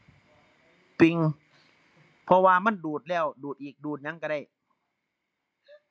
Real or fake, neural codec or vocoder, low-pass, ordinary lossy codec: real; none; none; none